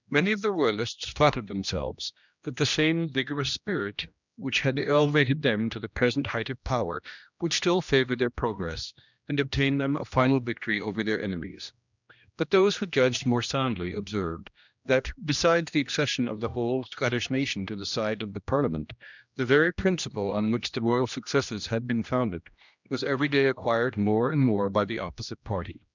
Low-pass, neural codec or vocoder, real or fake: 7.2 kHz; codec, 16 kHz, 1 kbps, X-Codec, HuBERT features, trained on general audio; fake